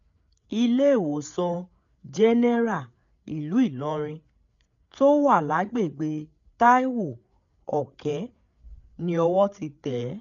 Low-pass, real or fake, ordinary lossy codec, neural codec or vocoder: 7.2 kHz; fake; none; codec, 16 kHz, 8 kbps, FreqCodec, larger model